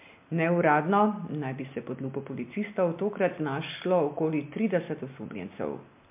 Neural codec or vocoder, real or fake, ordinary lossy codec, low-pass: none; real; MP3, 32 kbps; 3.6 kHz